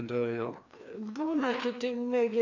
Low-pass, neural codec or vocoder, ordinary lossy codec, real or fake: 7.2 kHz; codec, 16 kHz, 2 kbps, FreqCodec, larger model; AAC, 48 kbps; fake